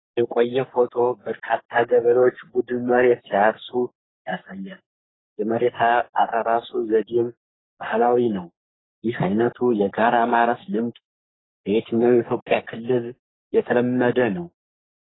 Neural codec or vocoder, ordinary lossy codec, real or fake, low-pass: codec, 44.1 kHz, 3.4 kbps, Pupu-Codec; AAC, 16 kbps; fake; 7.2 kHz